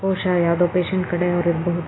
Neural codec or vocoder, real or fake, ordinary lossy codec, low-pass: none; real; AAC, 16 kbps; 7.2 kHz